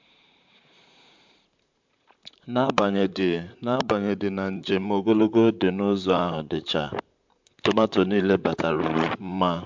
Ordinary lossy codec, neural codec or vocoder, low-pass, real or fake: MP3, 64 kbps; vocoder, 44.1 kHz, 128 mel bands, Pupu-Vocoder; 7.2 kHz; fake